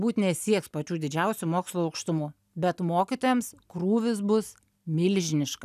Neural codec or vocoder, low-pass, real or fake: none; 14.4 kHz; real